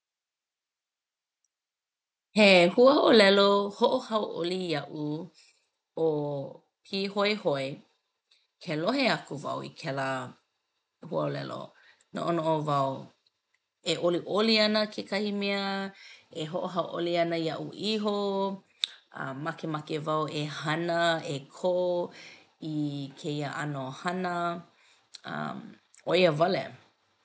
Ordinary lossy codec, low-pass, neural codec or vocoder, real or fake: none; none; none; real